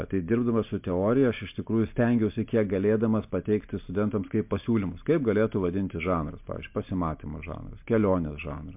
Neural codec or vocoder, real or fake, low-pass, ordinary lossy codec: none; real; 3.6 kHz; MP3, 32 kbps